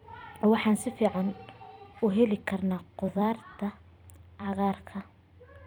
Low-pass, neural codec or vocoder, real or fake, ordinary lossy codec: 19.8 kHz; vocoder, 44.1 kHz, 128 mel bands every 256 samples, BigVGAN v2; fake; none